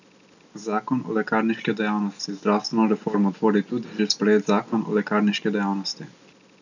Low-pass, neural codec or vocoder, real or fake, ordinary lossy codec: 7.2 kHz; none; real; none